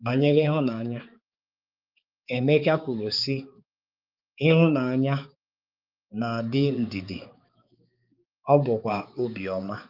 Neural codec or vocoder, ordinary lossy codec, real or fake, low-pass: codec, 16 kHz, 4 kbps, X-Codec, HuBERT features, trained on balanced general audio; Opus, 32 kbps; fake; 5.4 kHz